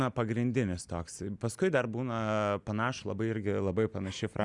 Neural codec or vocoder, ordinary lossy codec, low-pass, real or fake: none; Opus, 64 kbps; 10.8 kHz; real